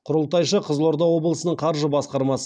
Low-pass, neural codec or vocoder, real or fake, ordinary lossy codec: 9.9 kHz; none; real; none